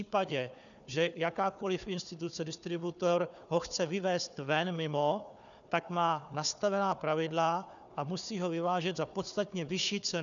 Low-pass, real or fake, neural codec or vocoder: 7.2 kHz; fake; codec, 16 kHz, 4 kbps, FunCodec, trained on Chinese and English, 50 frames a second